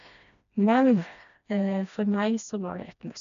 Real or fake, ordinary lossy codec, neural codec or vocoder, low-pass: fake; none; codec, 16 kHz, 1 kbps, FreqCodec, smaller model; 7.2 kHz